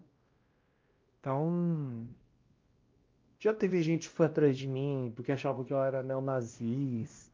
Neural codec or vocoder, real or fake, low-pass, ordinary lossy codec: codec, 16 kHz, 1 kbps, X-Codec, WavLM features, trained on Multilingual LibriSpeech; fake; 7.2 kHz; Opus, 24 kbps